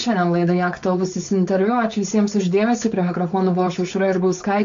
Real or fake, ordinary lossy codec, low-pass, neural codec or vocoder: fake; AAC, 64 kbps; 7.2 kHz; codec, 16 kHz, 4.8 kbps, FACodec